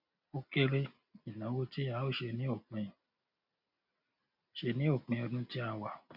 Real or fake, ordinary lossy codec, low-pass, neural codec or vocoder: real; none; 5.4 kHz; none